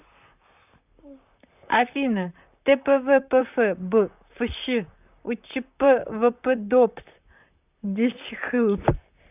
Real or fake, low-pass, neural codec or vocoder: fake; 3.6 kHz; vocoder, 44.1 kHz, 128 mel bands, Pupu-Vocoder